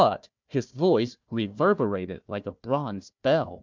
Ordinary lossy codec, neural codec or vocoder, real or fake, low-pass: MP3, 64 kbps; codec, 16 kHz, 1 kbps, FunCodec, trained on Chinese and English, 50 frames a second; fake; 7.2 kHz